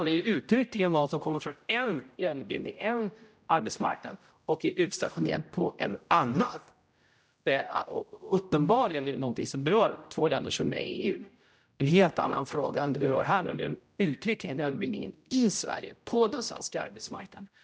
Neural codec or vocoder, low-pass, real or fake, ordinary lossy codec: codec, 16 kHz, 0.5 kbps, X-Codec, HuBERT features, trained on general audio; none; fake; none